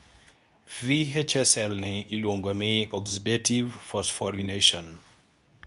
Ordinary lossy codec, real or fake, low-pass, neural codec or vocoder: none; fake; 10.8 kHz; codec, 24 kHz, 0.9 kbps, WavTokenizer, medium speech release version 2